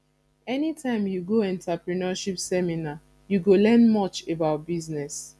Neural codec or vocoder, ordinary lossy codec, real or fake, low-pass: none; none; real; none